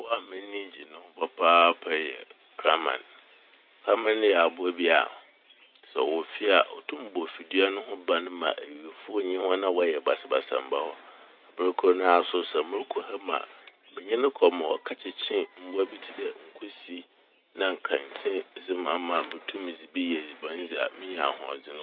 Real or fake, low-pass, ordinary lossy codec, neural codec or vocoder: real; 5.4 kHz; none; none